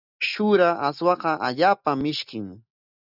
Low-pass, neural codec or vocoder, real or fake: 5.4 kHz; none; real